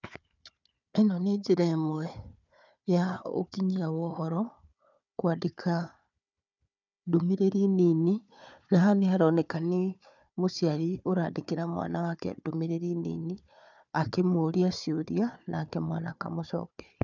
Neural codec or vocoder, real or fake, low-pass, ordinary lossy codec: codec, 16 kHz, 4 kbps, FunCodec, trained on Chinese and English, 50 frames a second; fake; 7.2 kHz; none